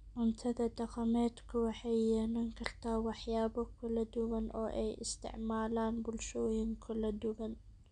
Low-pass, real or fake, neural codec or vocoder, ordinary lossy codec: 9.9 kHz; real; none; none